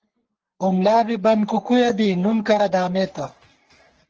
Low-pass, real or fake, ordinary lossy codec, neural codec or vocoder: 7.2 kHz; fake; Opus, 16 kbps; codec, 44.1 kHz, 3.4 kbps, Pupu-Codec